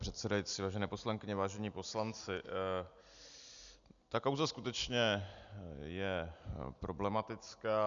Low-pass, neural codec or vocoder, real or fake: 7.2 kHz; none; real